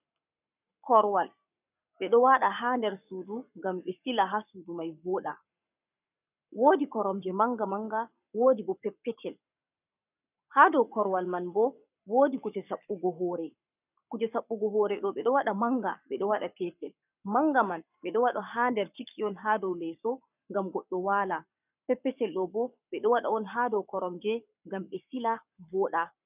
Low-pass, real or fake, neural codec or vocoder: 3.6 kHz; fake; codec, 44.1 kHz, 7.8 kbps, Pupu-Codec